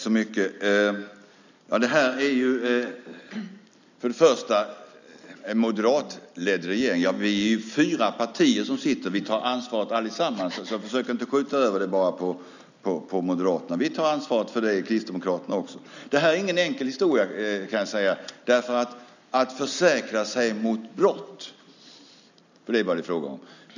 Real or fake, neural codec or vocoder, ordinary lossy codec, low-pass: real; none; none; 7.2 kHz